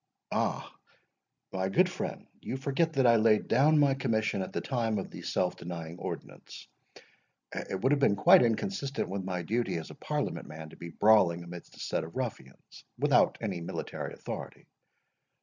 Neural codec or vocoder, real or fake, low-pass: none; real; 7.2 kHz